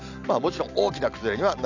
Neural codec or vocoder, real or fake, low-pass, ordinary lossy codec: none; real; 7.2 kHz; none